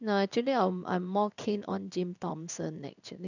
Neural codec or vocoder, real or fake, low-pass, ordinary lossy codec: codec, 16 kHz in and 24 kHz out, 1 kbps, XY-Tokenizer; fake; 7.2 kHz; none